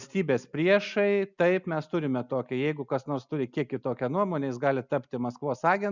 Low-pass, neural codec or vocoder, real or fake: 7.2 kHz; none; real